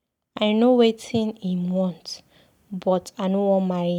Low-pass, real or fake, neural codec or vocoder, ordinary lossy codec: 19.8 kHz; real; none; none